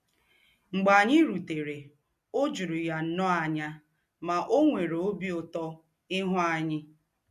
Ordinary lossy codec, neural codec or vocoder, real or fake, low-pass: MP3, 64 kbps; none; real; 14.4 kHz